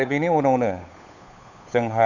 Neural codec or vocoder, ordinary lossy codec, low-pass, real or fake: codec, 16 kHz, 8 kbps, FunCodec, trained on Chinese and English, 25 frames a second; none; 7.2 kHz; fake